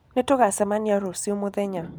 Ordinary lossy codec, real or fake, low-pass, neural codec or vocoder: none; real; none; none